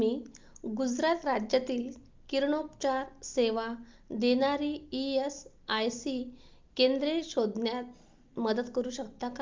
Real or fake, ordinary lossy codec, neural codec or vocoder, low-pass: real; Opus, 24 kbps; none; 7.2 kHz